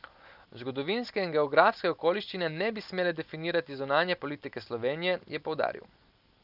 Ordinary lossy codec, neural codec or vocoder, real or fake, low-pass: none; none; real; 5.4 kHz